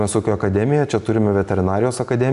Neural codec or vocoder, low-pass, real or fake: none; 10.8 kHz; real